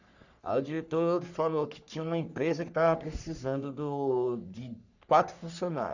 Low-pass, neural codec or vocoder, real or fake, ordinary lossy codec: 7.2 kHz; codec, 44.1 kHz, 3.4 kbps, Pupu-Codec; fake; none